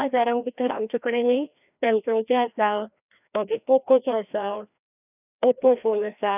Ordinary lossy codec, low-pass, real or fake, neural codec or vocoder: none; 3.6 kHz; fake; codec, 16 kHz, 1 kbps, FreqCodec, larger model